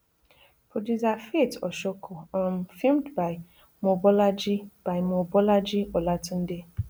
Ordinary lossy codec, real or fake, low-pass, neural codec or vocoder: none; real; none; none